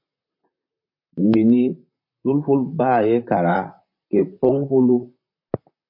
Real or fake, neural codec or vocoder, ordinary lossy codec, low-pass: fake; vocoder, 44.1 kHz, 128 mel bands, Pupu-Vocoder; MP3, 32 kbps; 5.4 kHz